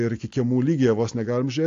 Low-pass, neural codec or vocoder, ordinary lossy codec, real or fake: 7.2 kHz; none; AAC, 48 kbps; real